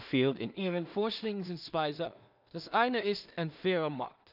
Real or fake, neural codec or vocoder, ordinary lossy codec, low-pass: fake; codec, 16 kHz in and 24 kHz out, 0.4 kbps, LongCat-Audio-Codec, two codebook decoder; none; 5.4 kHz